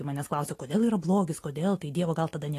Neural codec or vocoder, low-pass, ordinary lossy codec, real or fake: none; 14.4 kHz; AAC, 48 kbps; real